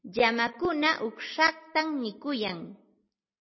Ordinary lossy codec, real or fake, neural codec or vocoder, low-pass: MP3, 24 kbps; real; none; 7.2 kHz